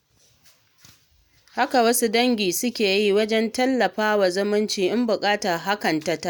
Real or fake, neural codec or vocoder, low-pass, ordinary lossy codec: real; none; none; none